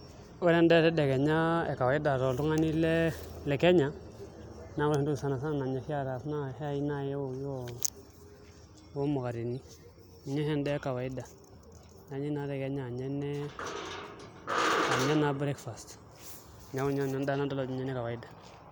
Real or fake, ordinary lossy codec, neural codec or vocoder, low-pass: real; none; none; none